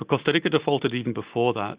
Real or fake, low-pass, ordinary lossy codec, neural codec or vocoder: real; 3.6 kHz; Opus, 64 kbps; none